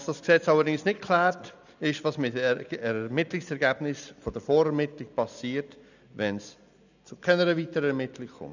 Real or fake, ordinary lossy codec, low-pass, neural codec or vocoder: real; none; 7.2 kHz; none